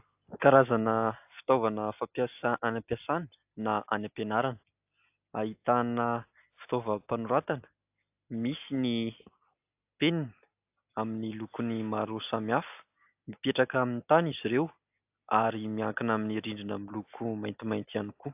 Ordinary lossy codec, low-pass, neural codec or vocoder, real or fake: AAC, 32 kbps; 3.6 kHz; none; real